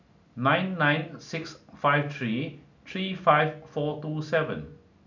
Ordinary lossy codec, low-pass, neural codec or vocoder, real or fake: none; 7.2 kHz; none; real